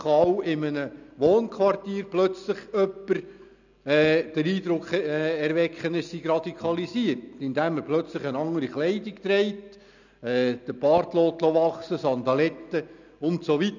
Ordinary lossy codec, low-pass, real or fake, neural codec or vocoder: none; 7.2 kHz; real; none